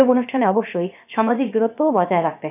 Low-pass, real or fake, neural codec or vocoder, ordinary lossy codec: 3.6 kHz; fake; codec, 16 kHz, about 1 kbps, DyCAST, with the encoder's durations; none